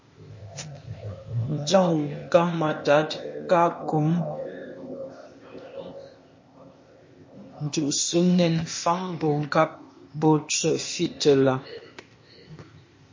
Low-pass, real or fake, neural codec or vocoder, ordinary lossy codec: 7.2 kHz; fake; codec, 16 kHz, 0.8 kbps, ZipCodec; MP3, 32 kbps